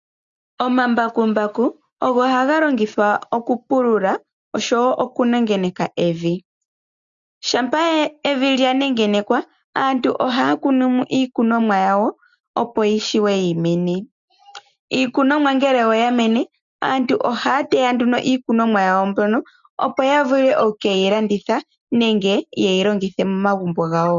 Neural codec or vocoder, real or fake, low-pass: none; real; 7.2 kHz